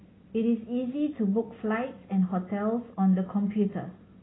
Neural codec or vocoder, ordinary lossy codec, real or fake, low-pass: none; AAC, 16 kbps; real; 7.2 kHz